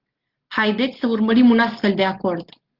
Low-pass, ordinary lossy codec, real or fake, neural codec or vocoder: 5.4 kHz; Opus, 16 kbps; real; none